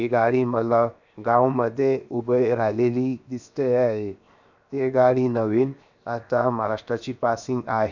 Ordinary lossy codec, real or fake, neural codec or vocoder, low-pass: none; fake; codec, 16 kHz, 0.7 kbps, FocalCodec; 7.2 kHz